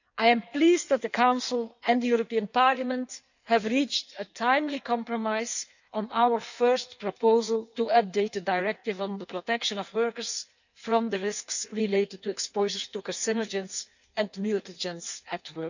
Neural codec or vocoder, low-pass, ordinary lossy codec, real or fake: codec, 16 kHz in and 24 kHz out, 1.1 kbps, FireRedTTS-2 codec; 7.2 kHz; none; fake